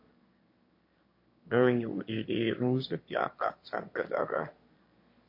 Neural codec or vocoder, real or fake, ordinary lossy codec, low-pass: autoencoder, 22.05 kHz, a latent of 192 numbers a frame, VITS, trained on one speaker; fake; MP3, 32 kbps; 5.4 kHz